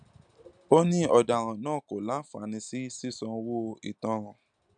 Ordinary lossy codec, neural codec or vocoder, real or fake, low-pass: none; none; real; 9.9 kHz